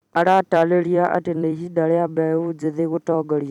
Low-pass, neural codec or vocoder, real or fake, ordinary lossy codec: 19.8 kHz; vocoder, 44.1 kHz, 128 mel bands every 256 samples, BigVGAN v2; fake; none